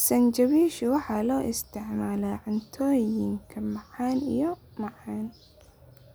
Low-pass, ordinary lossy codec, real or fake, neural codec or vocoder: none; none; real; none